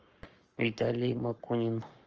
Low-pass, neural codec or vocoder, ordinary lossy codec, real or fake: 7.2 kHz; codec, 44.1 kHz, 7.8 kbps, Pupu-Codec; Opus, 24 kbps; fake